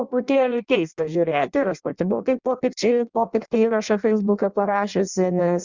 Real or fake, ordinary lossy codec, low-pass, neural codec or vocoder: fake; Opus, 64 kbps; 7.2 kHz; codec, 16 kHz in and 24 kHz out, 0.6 kbps, FireRedTTS-2 codec